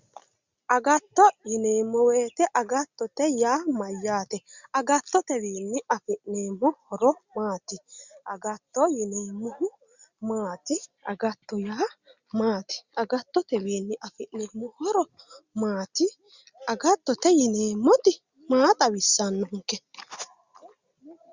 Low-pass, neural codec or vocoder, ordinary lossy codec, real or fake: 7.2 kHz; none; Opus, 64 kbps; real